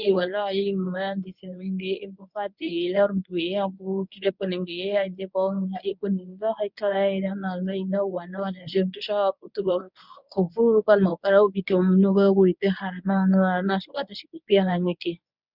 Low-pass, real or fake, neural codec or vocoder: 5.4 kHz; fake; codec, 24 kHz, 0.9 kbps, WavTokenizer, medium speech release version 1